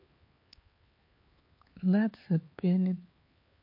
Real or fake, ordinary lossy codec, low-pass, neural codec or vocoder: fake; MP3, 32 kbps; 5.4 kHz; codec, 16 kHz, 4 kbps, X-Codec, HuBERT features, trained on LibriSpeech